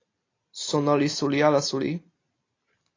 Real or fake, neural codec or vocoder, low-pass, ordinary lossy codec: real; none; 7.2 kHz; AAC, 32 kbps